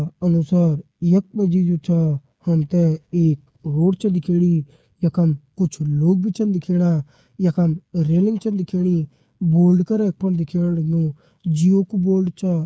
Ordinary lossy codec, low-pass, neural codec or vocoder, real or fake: none; none; codec, 16 kHz, 8 kbps, FreqCodec, smaller model; fake